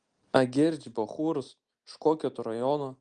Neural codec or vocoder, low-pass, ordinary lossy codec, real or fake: none; 9.9 kHz; Opus, 24 kbps; real